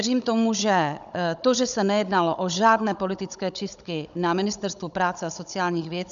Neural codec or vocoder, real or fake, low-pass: codec, 16 kHz, 16 kbps, FunCodec, trained on Chinese and English, 50 frames a second; fake; 7.2 kHz